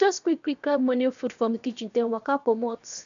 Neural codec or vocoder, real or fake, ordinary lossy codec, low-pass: codec, 16 kHz, 0.7 kbps, FocalCodec; fake; none; 7.2 kHz